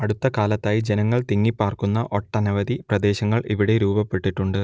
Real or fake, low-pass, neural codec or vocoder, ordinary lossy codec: real; none; none; none